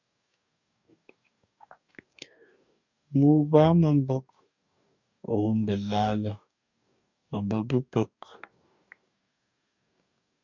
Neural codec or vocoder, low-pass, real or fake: codec, 44.1 kHz, 2.6 kbps, DAC; 7.2 kHz; fake